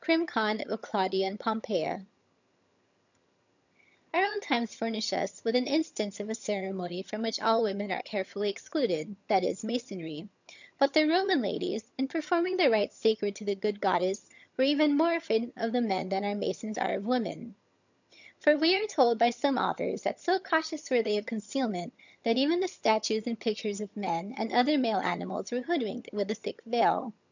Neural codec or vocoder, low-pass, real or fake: vocoder, 22.05 kHz, 80 mel bands, HiFi-GAN; 7.2 kHz; fake